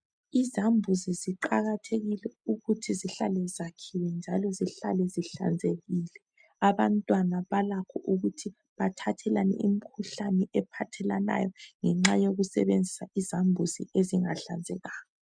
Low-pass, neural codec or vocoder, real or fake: 9.9 kHz; none; real